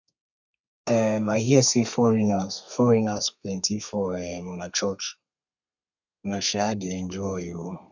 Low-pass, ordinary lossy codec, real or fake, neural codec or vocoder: 7.2 kHz; none; fake; codec, 32 kHz, 1.9 kbps, SNAC